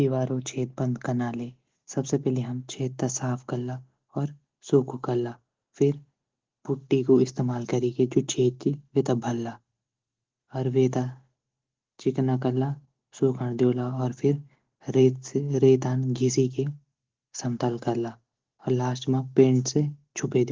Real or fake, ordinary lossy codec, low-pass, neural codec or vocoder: real; Opus, 16 kbps; 7.2 kHz; none